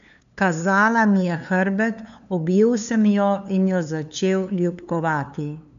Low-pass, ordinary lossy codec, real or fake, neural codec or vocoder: 7.2 kHz; none; fake; codec, 16 kHz, 2 kbps, FunCodec, trained on LibriTTS, 25 frames a second